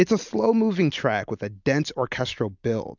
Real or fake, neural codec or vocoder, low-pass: real; none; 7.2 kHz